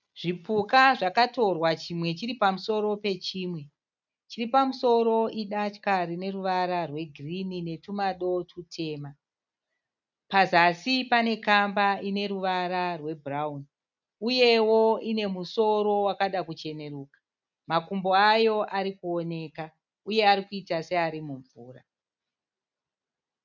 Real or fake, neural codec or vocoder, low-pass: real; none; 7.2 kHz